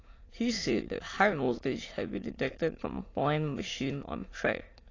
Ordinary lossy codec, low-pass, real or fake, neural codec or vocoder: AAC, 32 kbps; 7.2 kHz; fake; autoencoder, 22.05 kHz, a latent of 192 numbers a frame, VITS, trained on many speakers